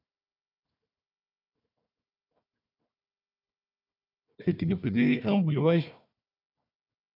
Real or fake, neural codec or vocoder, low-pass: fake; codec, 16 kHz, 1 kbps, FunCodec, trained on Chinese and English, 50 frames a second; 5.4 kHz